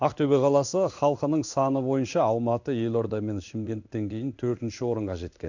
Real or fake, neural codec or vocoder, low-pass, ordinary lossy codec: fake; codec, 16 kHz in and 24 kHz out, 1 kbps, XY-Tokenizer; 7.2 kHz; none